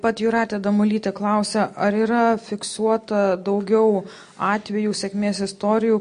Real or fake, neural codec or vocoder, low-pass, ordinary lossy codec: real; none; 9.9 kHz; MP3, 48 kbps